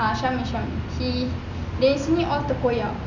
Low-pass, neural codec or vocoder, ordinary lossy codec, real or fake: 7.2 kHz; none; none; real